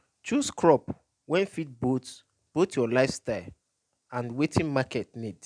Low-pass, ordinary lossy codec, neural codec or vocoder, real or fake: 9.9 kHz; none; none; real